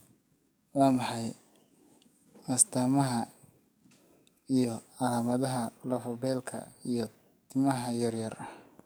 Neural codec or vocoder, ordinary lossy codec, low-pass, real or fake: codec, 44.1 kHz, 7.8 kbps, DAC; none; none; fake